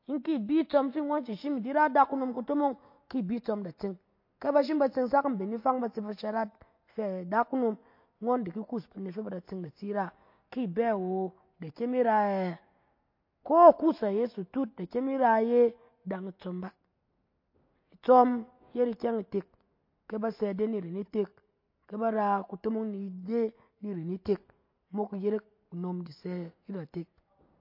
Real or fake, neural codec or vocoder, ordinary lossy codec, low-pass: real; none; MP3, 32 kbps; 5.4 kHz